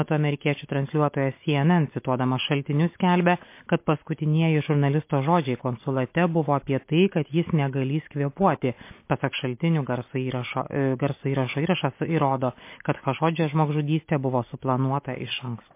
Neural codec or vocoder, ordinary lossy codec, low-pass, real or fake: none; MP3, 24 kbps; 3.6 kHz; real